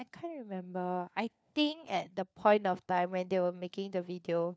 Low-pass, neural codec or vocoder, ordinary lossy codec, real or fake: none; codec, 16 kHz, 4 kbps, FunCodec, trained on LibriTTS, 50 frames a second; none; fake